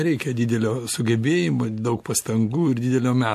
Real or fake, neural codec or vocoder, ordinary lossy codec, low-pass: real; none; MP3, 64 kbps; 14.4 kHz